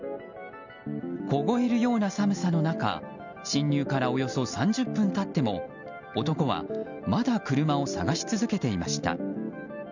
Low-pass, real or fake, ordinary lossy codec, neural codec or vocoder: 7.2 kHz; real; none; none